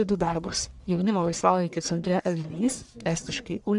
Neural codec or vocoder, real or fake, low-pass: codec, 44.1 kHz, 1.7 kbps, Pupu-Codec; fake; 10.8 kHz